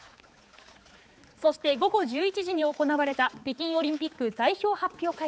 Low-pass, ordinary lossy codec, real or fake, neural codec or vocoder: none; none; fake; codec, 16 kHz, 4 kbps, X-Codec, HuBERT features, trained on balanced general audio